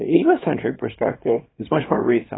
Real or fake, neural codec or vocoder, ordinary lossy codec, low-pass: fake; codec, 24 kHz, 0.9 kbps, WavTokenizer, small release; AAC, 16 kbps; 7.2 kHz